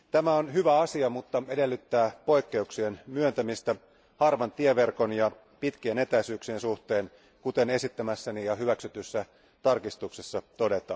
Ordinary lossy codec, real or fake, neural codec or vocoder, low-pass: none; real; none; none